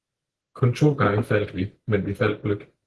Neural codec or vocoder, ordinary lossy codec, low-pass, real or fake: none; Opus, 16 kbps; 10.8 kHz; real